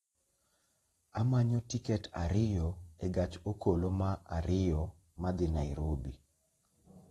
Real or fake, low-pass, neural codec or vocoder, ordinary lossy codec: fake; 19.8 kHz; vocoder, 44.1 kHz, 128 mel bands every 512 samples, BigVGAN v2; AAC, 32 kbps